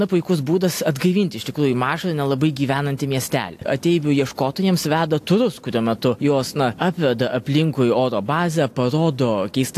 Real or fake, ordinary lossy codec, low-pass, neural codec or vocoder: real; AAC, 64 kbps; 14.4 kHz; none